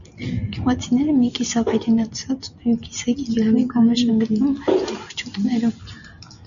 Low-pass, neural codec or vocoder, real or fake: 7.2 kHz; none; real